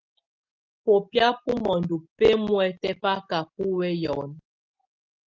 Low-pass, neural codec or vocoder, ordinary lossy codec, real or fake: 7.2 kHz; none; Opus, 32 kbps; real